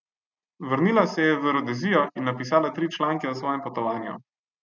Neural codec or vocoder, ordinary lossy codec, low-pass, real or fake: none; none; 7.2 kHz; real